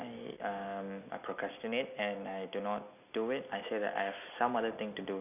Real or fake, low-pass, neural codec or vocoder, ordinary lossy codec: real; 3.6 kHz; none; none